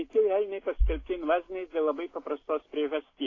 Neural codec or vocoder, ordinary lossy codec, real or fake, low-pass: none; AAC, 32 kbps; real; 7.2 kHz